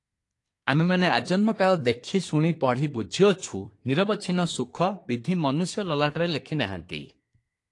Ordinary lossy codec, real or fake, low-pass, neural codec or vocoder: AAC, 48 kbps; fake; 10.8 kHz; codec, 24 kHz, 1 kbps, SNAC